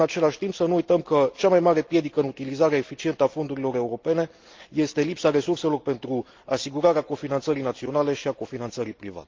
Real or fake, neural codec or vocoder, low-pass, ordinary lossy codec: real; none; 7.2 kHz; Opus, 32 kbps